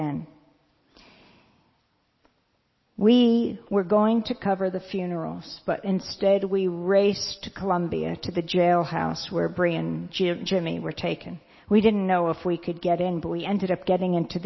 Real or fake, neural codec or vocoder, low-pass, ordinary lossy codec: real; none; 7.2 kHz; MP3, 24 kbps